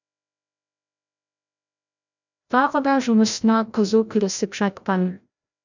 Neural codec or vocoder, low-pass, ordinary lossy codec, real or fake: codec, 16 kHz, 0.5 kbps, FreqCodec, larger model; 7.2 kHz; none; fake